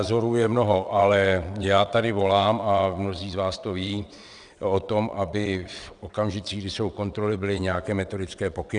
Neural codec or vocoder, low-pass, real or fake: vocoder, 22.05 kHz, 80 mel bands, WaveNeXt; 9.9 kHz; fake